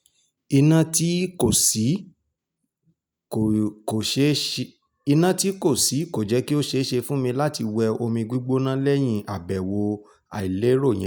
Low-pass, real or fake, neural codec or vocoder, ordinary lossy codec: none; real; none; none